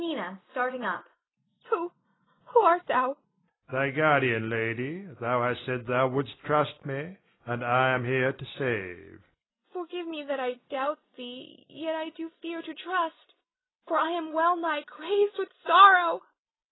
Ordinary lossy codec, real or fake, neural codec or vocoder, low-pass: AAC, 16 kbps; real; none; 7.2 kHz